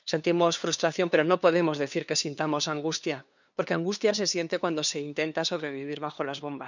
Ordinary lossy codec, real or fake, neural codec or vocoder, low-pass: none; fake; codec, 16 kHz, 2 kbps, FunCodec, trained on LibriTTS, 25 frames a second; 7.2 kHz